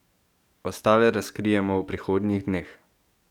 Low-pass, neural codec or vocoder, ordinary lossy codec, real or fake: 19.8 kHz; codec, 44.1 kHz, 7.8 kbps, DAC; none; fake